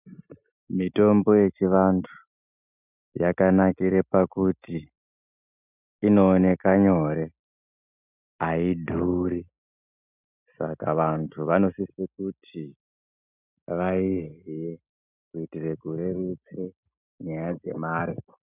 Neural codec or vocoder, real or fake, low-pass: none; real; 3.6 kHz